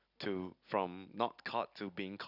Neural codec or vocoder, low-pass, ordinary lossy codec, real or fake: none; 5.4 kHz; none; real